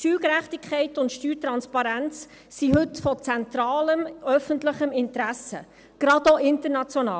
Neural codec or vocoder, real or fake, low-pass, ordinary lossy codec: none; real; none; none